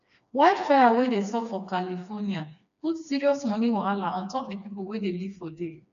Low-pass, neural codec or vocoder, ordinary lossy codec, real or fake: 7.2 kHz; codec, 16 kHz, 2 kbps, FreqCodec, smaller model; none; fake